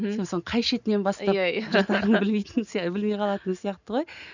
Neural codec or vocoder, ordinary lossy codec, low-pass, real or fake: none; none; 7.2 kHz; real